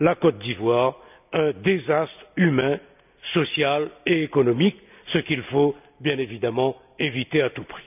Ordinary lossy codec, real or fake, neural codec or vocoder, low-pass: none; real; none; 3.6 kHz